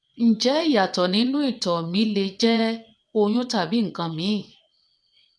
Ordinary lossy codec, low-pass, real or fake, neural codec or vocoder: none; none; fake; vocoder, 22.05 kHz, 80 mel bands, WaveNeXt